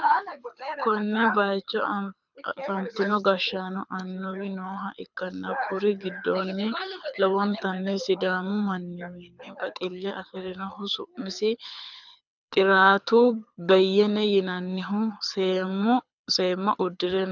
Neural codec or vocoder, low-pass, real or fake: codec, 24 kHz, 6 kbps, HILCodec; 7.2 kHz; fake